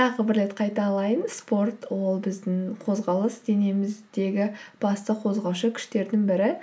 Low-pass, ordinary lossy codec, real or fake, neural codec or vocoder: none; none; real; none